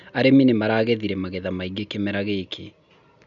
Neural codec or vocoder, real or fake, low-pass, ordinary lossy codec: none; real; 7.2 kHz; none